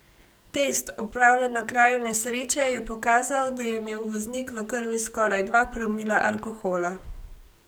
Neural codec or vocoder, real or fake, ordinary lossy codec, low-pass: codec, 44.1 kHz, 2.6 kbps, SNAC; fake; none; none